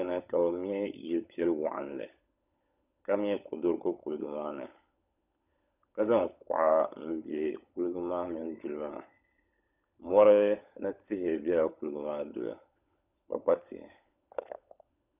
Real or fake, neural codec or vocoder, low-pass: fake; codec, 16 kHz, 16 kbps, FunCodec, trained on LibriTTS, 50 frames a second; 3.6 kHz